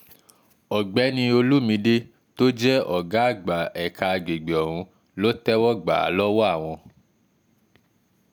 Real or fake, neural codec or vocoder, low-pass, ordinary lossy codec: real; none; none; none